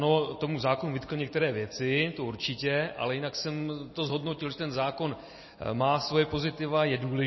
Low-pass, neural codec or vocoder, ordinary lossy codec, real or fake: 7.2 kHz; none; MP3, 24 kbps; real